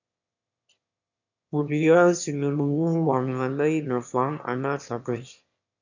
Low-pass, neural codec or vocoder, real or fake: 7.2 kHz; autoencoder, 22.05 kHz, a latent of 192 numbers a frame, VITS, trained on one speaker; fake